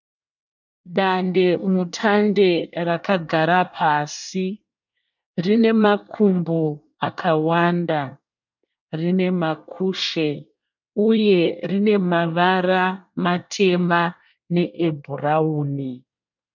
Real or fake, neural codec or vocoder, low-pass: fake; codec, 24 kHz, 1 kbps, SNAC; 7.2 kHz